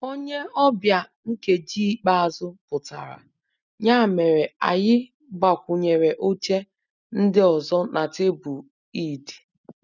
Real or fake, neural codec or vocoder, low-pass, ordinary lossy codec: real; none; 7.2 kHz; none